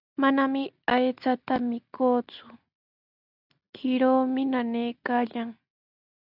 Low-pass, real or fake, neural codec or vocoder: 5.4 kHz; real; none